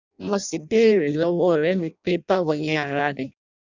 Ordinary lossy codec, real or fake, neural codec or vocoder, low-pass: none; fake; codec, 16 kHz in and 24 kHz out, 0.6 kbps, FireRedTTS-2 codec; 7.2 kHz